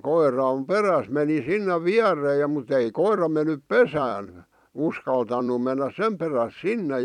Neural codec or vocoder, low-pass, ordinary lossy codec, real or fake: vocoder, 44.1 kHz, 128 mel bands every 256 samples, BigVGAN v2; 19.8 kHz; none; fake